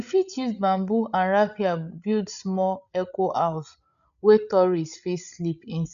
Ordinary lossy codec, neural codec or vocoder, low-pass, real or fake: none; codec, 16 kHz, 8 kbps, FreqCodec, larger model; 7.2 kHz; fake